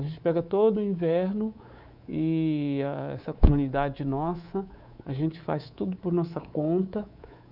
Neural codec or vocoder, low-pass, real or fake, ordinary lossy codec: codec, 24 kHz, 3.1 kbps, DualCodec; 5.4 kHz; fake; none